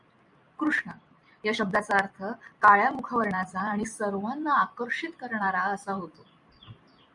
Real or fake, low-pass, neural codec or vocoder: fake; 10.8 kHz; vocoder, 44.1 kHz, 128 mel bands every 256 samples, BigVGAN v2